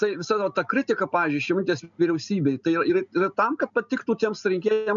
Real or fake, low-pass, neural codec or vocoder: real; 7.2 kHz; none